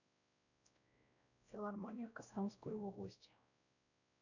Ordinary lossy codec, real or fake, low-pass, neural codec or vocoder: none; fake; 7.2 kHz; codec, 16 kHz, 0.5 kbps, X-Codec, WavLM features, trained on Multilingual LibriSpeech